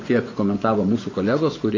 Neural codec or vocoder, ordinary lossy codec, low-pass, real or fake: vocoder, 24 kHz, 100 mel bands, Vocos; AAC, 32 kbps; 7.2 kHz; fake